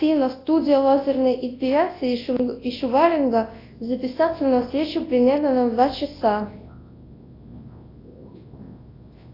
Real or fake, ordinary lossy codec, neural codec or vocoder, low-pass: fake; AAC, 24 kbps; codec, 24 kHz, 0.9 kbps, WavTokenizer, large speech release; 5.4 kHz